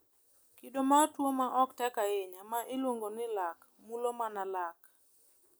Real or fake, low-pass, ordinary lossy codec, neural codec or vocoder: real; none; none; none